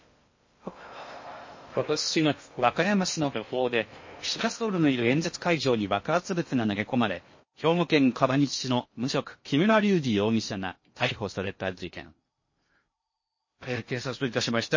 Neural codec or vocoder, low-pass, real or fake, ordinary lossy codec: codec, 16 kHz in and 24 kHz out, 0.6 kbps, FocalCodec, streaming, 2048 codes; 7.2 kHz; fake; MP3, 32 kbps